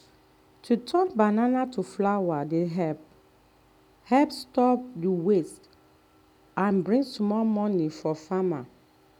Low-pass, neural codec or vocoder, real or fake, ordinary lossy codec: 19.8 kHz; none; real; none